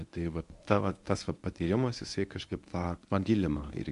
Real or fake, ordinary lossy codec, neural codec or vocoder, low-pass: fake; AAC, 64 kbps; codec, 24 kHz, 0.9 kbps, WavTokenizer, medium speech release version 1; 10.8 kHz